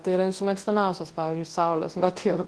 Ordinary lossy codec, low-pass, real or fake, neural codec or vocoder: Opus, 16 kbps; 10.8 kHz; fake; codec, 24 kHz, 0.9 kbps, WavTokenizer, large speech release